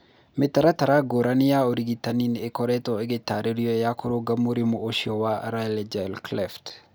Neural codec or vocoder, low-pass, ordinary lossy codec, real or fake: none; none; none; real